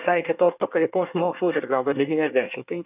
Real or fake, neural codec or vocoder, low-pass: fake; codec, 24 kHz, 1 kbps, SNAC; 3.6 kHz